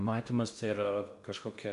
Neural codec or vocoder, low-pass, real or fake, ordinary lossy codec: codec, 16 kHz in and 24 kHz out, 0.6 kbps, FocalCodec, streaming, 2048 codes; 10.8 kHz; fake; MP3, 64 kbps